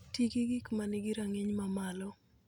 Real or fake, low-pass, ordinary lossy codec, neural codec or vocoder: real; 19.8 kHz; none; none